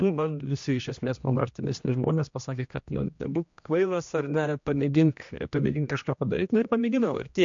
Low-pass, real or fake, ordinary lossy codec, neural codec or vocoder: 7.2 kHz; fake; MP3, 48 kbps; codec, 16 kHz, 1 kbps, X-Codec, HuBERT features, trained on general audio